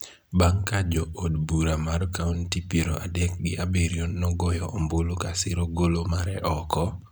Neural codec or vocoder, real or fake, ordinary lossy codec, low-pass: none; real; none; none